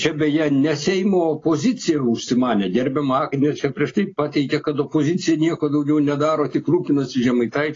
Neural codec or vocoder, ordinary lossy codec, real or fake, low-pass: none; AAC, 32 kbps; real; 7.2 kHz